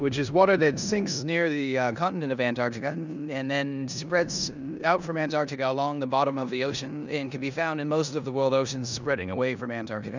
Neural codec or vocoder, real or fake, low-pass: codec, 16 kHz in and 24 kHz out, 0.9 kbps, LongCat-Audio-Codec, four codebook decoder; fake; 7.2 kHz